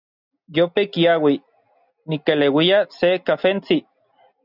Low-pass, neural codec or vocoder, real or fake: 5.4 kHz; none; real